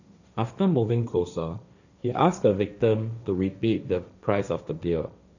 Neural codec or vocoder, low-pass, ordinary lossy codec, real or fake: codec, 16 kHz, 1.1 kbps, Voila-Tokenizer; 7.2 kHz; none; fake